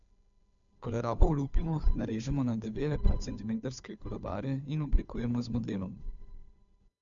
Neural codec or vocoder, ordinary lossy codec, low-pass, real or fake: codec, 16 kHz, 2 kbps, FunCodec, trained on Chinese and English, 25 frames a second; none; 7.2 kHz; fake